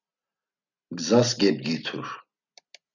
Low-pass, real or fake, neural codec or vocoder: 7.2 kHz; real; none